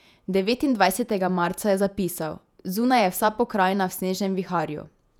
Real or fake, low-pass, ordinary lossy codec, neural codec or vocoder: fake; 19.8 kHz; none; vocoder, 48 kHz, 128 mel bands, Vocos